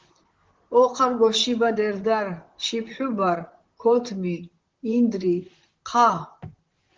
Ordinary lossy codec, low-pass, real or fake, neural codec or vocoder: Opus, 16 kbps; 7.2 kHz; fake; vocoder, 44.1 kHz, 128 mel bands, Pupu-Vocoder